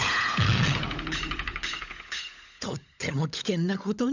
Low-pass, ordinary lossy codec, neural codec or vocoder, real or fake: 7.2 kHz; none; codec, 16 kHz, 16 kbps, FunCodec, trained on LibriTTS, 50 frames a second; fake